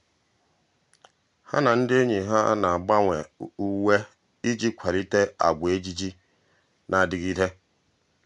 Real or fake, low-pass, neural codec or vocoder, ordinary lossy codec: real; 10.8 kHz; none; none